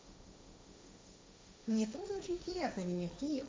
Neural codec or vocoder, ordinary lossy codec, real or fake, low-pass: codec, 16 kHz, 1.1 kbps, Voila-Tokenizer; none; fake; none